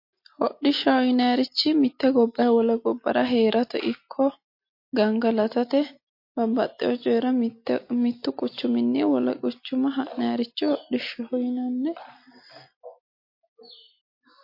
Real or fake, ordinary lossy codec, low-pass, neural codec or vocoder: real; MP3, 32 kbps; 5.4 kHz; none